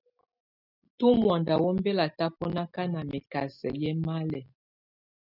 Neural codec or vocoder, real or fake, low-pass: none; real; 5.4 kHz